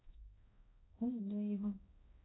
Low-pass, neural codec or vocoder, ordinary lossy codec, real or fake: 7.2 kHz; codec, 16 kHz, 0.5 kbps, X-Codec, HuBERT features, trained on balanced general audio; AAC, 16 kbps; fake